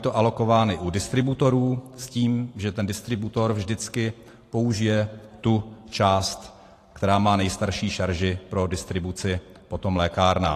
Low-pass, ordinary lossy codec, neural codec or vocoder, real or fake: 14.4 kHz; AAC, 48 kbps; vocoder, 44.1 kHz, 128 mel bands every 512 samples, BigVGAN v2; fake